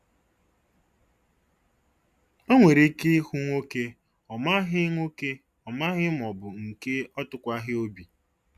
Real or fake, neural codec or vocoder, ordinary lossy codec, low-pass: real; none; none; 14.4 kHz